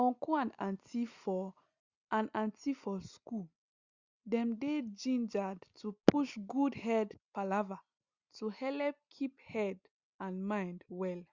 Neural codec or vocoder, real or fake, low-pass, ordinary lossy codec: none; real; 7.2 kHz; Opus, 64 kbps